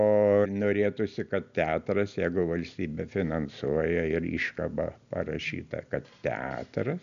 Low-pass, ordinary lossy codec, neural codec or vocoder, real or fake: 7.2 kHz; MP3, 96 kbps; none; real